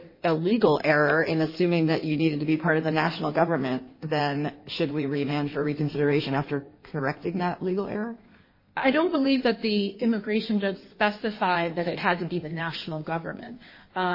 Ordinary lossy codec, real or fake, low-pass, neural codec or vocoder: MP3, 24 kbps; fake; 5.4 kHz; codec, 16 kHz in and 24 kHz out, 1.1 kbps, FireRedTTS-2 codec